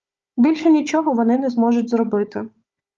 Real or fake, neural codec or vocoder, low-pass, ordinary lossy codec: fake; codec, 16 kHz, 4 kbps, FunCodec, trained on Chinese and English, 50 frames a second; 7.2 kHz; Opus, 24 kbps